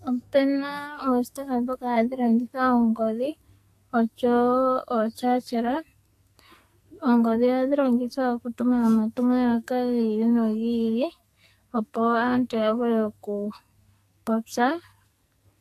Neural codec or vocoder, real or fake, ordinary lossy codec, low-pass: codec, 44.1 kHz, 2.6 kbps, SNAC; fake; AAC, 64 kbps; 14.4 kHz